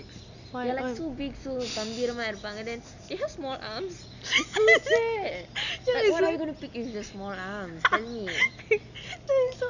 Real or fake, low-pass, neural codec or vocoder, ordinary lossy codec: real; 7.2 kHz; none; none